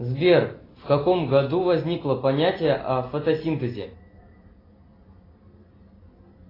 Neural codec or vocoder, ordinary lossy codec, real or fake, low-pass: none; AAC, 24 kbps; real; 5.4 kHz